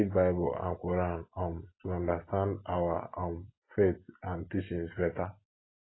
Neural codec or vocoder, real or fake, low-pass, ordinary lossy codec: none; real; 7.2 kHz; AAC, 16 kbps